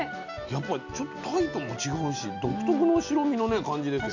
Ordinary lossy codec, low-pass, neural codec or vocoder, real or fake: none; 7.2 kHz; none; real